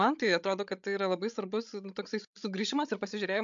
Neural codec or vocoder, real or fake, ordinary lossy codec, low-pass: codec, 16 kHz, 16 kbps, FreqCodec, larger model; fake; MP3, 64 kbps; 7.2 kHz